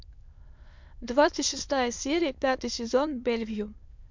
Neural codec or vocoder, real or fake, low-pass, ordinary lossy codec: autoencoder, 22.05 kHz, a latent of 192 numbers a frame, VITS, trained on many speakers; fake; 7.2 kHz; MP3, 64 kbps